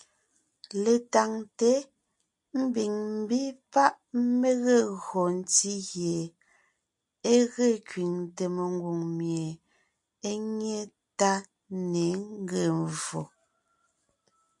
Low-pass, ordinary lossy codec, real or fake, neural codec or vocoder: 10.8 kHz; MP3, 64 kbps; real; none